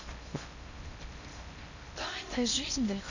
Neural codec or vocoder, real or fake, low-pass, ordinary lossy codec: codec, 16 kHz in and 24 kHz out, 0.8 kbps, FocalCodec, streaming, 65536 codes; fake; 7.2 kHz; none